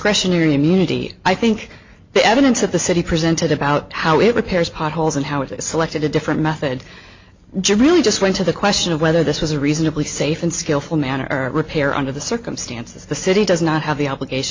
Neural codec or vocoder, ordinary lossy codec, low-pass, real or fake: none; MP3, 48 kbps; 7.2 kHz; real